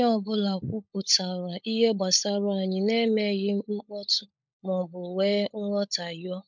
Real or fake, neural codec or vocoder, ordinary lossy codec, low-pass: fake; codec, 16 kHz, 16 kbps, FunCodec, trained on LibriTTS, 50 frames a second; MP3, 64 kbps; 7.2 kHz